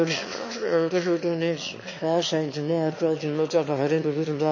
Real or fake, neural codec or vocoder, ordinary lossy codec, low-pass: fake; autoencoder, 22.05 kHz, a latent of 192 numbers a frame, VITS, trained on one speaker; MP3, 32 kbps; 7.2 kHz